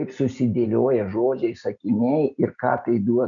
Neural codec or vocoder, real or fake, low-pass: none; real; 7.2 kHz